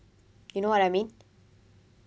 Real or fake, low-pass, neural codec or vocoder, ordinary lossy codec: real; none; none; none